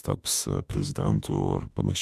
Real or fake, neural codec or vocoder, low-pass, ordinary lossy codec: fake; autoencoder, 48 kHz, 32 numbers a frame, DAC-VAE, trained on Japanese speech; 14.4 kHz; MP3, 96 kbps